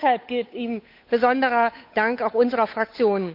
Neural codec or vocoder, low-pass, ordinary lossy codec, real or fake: codec, 16 kHz, 8 kbps, FunCodec, trained on Chinese and English, 25 frames a second; 5.4 kHz; none; fake